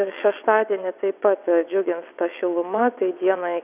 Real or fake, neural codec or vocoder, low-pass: fake; vocoder, 22.05 kHz, 80 mel bands, WaveNeXt; 3.6 kHz